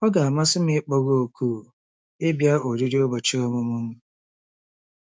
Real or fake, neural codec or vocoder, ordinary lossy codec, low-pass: fake; codec, 16 kHz, 6 kbps, DAC; none; none